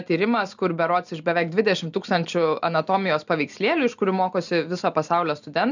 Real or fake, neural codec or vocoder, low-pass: real; none; 7.2 kHz